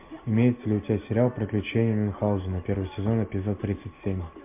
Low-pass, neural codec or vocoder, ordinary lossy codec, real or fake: 3.6 kHz; none; MP3, 32 kbps; real